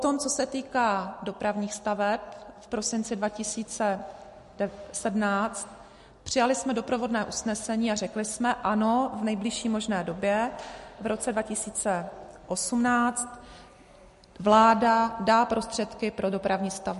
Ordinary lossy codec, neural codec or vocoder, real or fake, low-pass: MP3, 48 kbps; none; real; 14.4 kHz